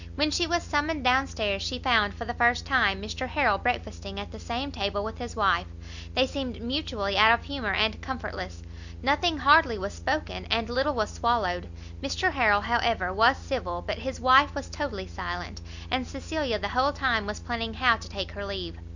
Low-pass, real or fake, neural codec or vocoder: 7.2 kHz; real; none